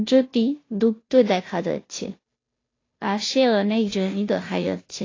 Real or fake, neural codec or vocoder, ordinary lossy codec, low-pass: fake; codec, 16 kHz, 0.5 kbps, FunCodec, trained on Chinese and English, 25 frames a second; AAC, 32 kbps; 7.2 kHz